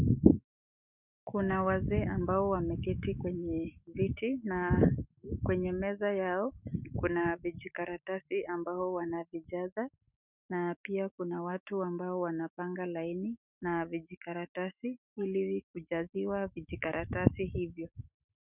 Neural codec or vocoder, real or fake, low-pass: autoencoder, 48 kHz, 128 numbers a frame, DAC-VAE, trained on Japanese speech; fake; 3.6 kHz